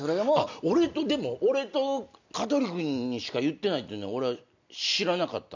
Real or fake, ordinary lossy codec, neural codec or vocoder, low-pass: real; none; none; 7.2 kHz